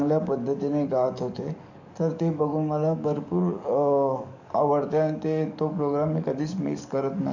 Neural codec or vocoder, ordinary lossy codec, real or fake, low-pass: codec, 44.1 kHz, 7.8 kbps, DAC; none; fake; 7.2 kHz